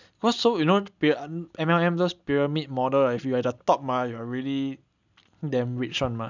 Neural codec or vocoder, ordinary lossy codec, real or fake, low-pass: none; none; real; 7.2 kHz